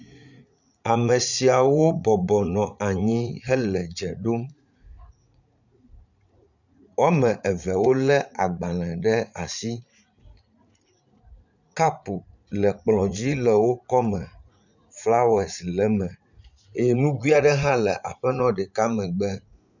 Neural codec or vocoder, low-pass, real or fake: vocoder, 44.1 kHz, 80 mel bands, Vocos; 7.2 kHz; fake